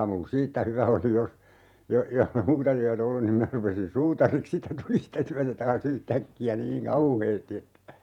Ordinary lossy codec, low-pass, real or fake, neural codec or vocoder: none; 19.8 kHz; fake; vocoder, 48 kHz, 128 mel bands, Vocos